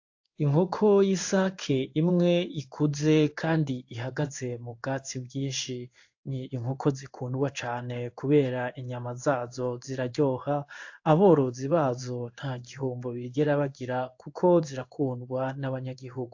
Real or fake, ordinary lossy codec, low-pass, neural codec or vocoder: fake; AAC, 48 kbps; 7.2 kHz; codec, 16 kHz in and 24 kHz out, 1 kbps, XY-Tokenizer